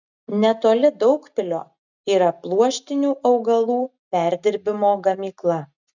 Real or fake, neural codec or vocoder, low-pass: real; none; 7.2 kHz